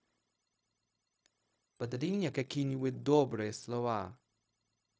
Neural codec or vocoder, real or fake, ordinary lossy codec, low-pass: codec, 16 kHz, 0.4 kbps, LongCat-Audio-Codec; fake; none; none